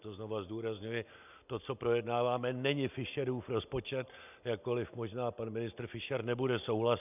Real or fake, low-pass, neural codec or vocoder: real; 3.6 kHz; none